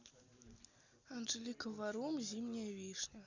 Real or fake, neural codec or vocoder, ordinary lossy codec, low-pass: real; none; Opus, 64 kbps; 7.2 kHz